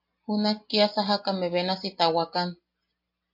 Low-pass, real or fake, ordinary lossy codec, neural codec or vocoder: 5.4 kHz; real; MP3, 32 kbps; none